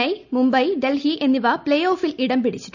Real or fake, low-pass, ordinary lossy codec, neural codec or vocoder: real; 7.2 kHz; none; none